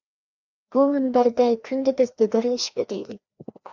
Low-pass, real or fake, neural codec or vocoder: 7.2 kHz; fake; codec, 16 kHz, 1 kbps, FreqCodec, larger model